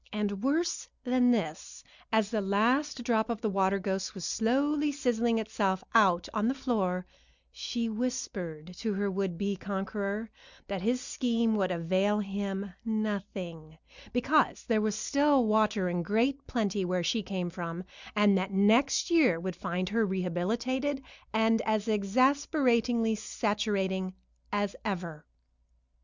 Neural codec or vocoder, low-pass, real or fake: none; 7.2 kHz; real